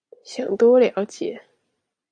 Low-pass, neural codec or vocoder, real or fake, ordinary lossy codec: 9.9 kHz; none; real; AAC, 64 kbps